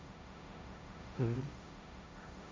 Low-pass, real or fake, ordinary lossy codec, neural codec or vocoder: none; fake; none; codec, 16 kHz, 1.1 kbps, Voila-Tokenizer